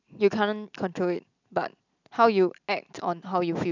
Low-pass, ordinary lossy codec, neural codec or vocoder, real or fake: 7.2 kHz; none; none; real